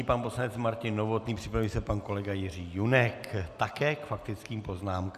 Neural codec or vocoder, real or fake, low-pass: none; real; 14.4 kHz